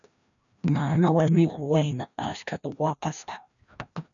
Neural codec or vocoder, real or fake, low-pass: codec, 16 kHz, 1 kbps, FreqCodec, larger model; fake; 7.2 kHz